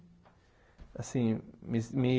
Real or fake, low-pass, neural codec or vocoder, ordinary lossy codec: real; none; none; none